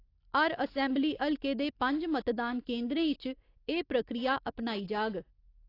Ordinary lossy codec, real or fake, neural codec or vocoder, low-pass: AAC, 32 kbps; real; none; 5.4 kHz